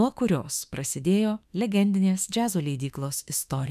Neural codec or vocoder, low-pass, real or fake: autoencoder, 48 kHz, 32 numbers a frame, DAC-VAE, trained on Japanese speech; 14.4 kHz; fake